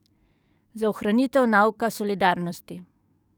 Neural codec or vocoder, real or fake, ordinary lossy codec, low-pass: codec, 44.1 kHz, 7.8 kbps, DAC; fake; none; 19.8 kHz